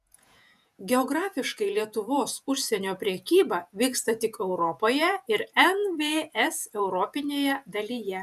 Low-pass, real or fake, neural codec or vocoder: 14.4 kHz; fake; vocoder, 48 kHz, 128 mel bands, Vocos